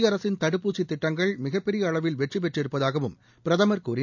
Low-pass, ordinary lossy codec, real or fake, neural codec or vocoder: 7.2 kHz; none; real; none